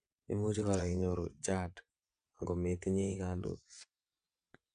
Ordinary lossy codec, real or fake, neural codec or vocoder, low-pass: none; fake; vocoder, 22.05 kHz, 80 mel bands, WaveNeXt; 9.9 kHz